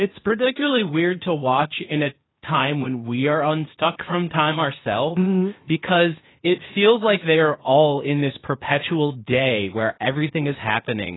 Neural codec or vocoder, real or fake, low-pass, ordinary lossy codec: codec, 16 kHz, 0.8 kbps, ZipCodec; fake; 7.2 kHz; AAC, 16 kbps